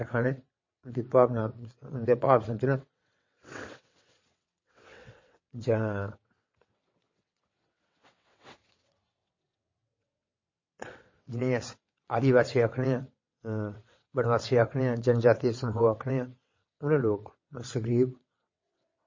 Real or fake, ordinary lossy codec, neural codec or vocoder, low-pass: fake; MP3, 32 kbps; vocoder, 22.05 kHz, 80 mel bands, WaveNeXt; 7.2 kHz